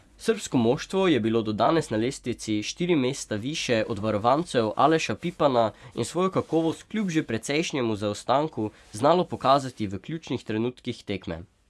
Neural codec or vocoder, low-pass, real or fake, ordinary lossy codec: vocoder, 24 kHz, 100 mel bands, Vocos; none; fake; none